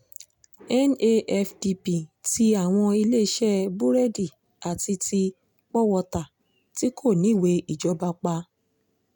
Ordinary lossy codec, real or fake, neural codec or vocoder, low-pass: none; real; none; 19.8 kHz